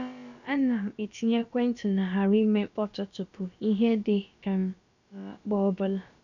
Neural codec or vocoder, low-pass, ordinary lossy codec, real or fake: codec, 16 kHz, about 1 kbps, DyCAST, with the encoder's durations; 7.2 kHz; none; fake